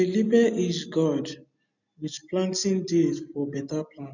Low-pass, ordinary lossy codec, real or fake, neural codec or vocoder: 7.2 kHz; none; real; none